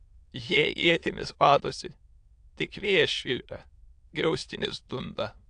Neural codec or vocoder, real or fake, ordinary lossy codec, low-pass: autoencoder, 22.05 kHz, a latent of 192 numbers a frame, VITS, trained on many speakers; fake; AAC, 64 kbps; 9.9 kHz